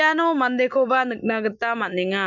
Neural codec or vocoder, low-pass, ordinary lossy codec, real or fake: none; 7.2 kHz; none; real